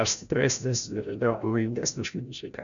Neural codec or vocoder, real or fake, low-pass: codec, 16 kHz, 0.5 kbps, FreqCodec, larger model; fake; 7.2 kHz